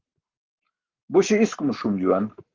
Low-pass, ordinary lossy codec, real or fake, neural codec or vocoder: 7.2 kHz; Opus, 16 kbps; fake; codec, 44.1 kHz, 7.8 kbps, Pupu-Codec